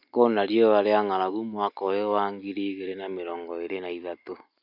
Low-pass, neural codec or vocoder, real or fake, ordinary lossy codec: 5.4 kHz; none; real; none